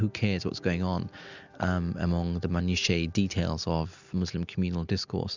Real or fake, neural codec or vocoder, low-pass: real; none; 7.2 kHz